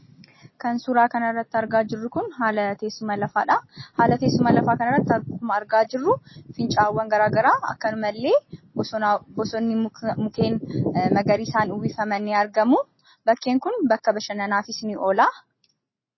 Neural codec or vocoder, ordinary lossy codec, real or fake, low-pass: none; MP3, 24 kbps; real; 7.2 kHz